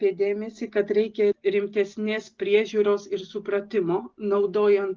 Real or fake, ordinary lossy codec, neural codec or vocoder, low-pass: real; Opus, 16 kbps; none; 7.2 kHz